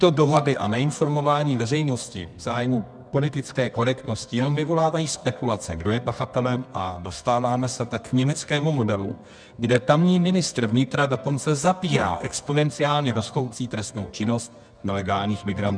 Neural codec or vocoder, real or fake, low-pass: codec, 24 kHz, 0.9 kbps, WavTokenizer, medium music audio release; fake; 9.9 kHz